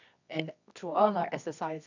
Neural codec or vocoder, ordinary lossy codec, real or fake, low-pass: codec, 24 kHz, 0.9 kbps, WavTokenizer, medium music audio release; none; fake; 7.2 kHz